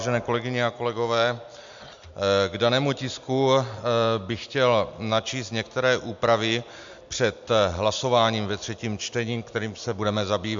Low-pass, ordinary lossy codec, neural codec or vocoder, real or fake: 7.2 kHz; MP3, 64 kbps; none; real